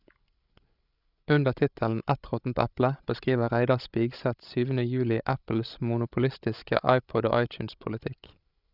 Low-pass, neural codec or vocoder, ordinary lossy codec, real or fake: 5.4 kHz; codec, 16 kHz, 16 kbps, FreqCodec, larger model; none; fake